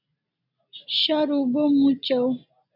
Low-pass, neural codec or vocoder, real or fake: 5.4 kHz; none; real